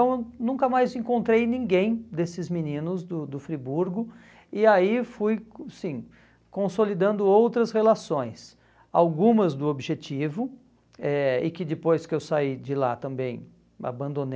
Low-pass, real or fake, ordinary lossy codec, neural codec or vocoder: none; real; none; none